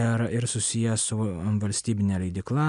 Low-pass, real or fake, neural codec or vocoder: 10.8 kHz; real; none